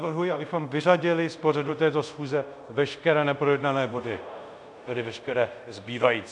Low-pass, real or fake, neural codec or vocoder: 10.8 kHz; fake; codec, 24 kHz, 0.5 kbps, DualCodec